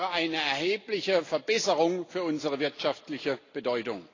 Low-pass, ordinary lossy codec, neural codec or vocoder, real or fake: 7.2 kHz; AAC, 32 kbps; none; real